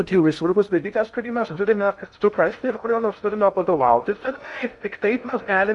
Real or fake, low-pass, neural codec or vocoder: fake; 10.8 kHz; codec, 16 kHz in and 24 kHz out, 0.6 kbps, FocalCodec, streaming, 2048 codes